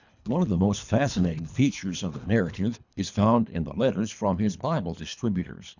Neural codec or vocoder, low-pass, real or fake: codec, 24 kHz, 3 kbps, HILCodec; 7.2 kHz; fake